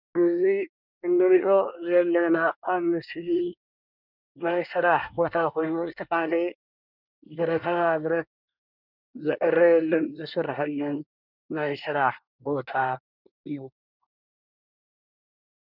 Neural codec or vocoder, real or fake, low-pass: codec, 24 kHz, 1 kbps, SNAC; fake; 5.4 kHz